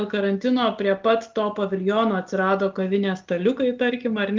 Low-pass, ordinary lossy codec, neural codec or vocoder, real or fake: 7.2 kHz; Opus, 32 kbps; none; real